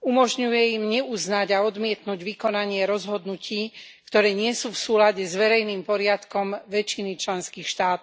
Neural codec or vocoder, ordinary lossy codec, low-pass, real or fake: none; none; none; real